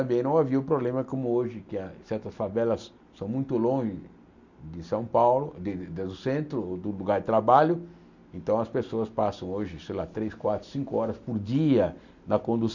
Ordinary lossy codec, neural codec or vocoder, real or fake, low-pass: none; none; real; 7.2 kHz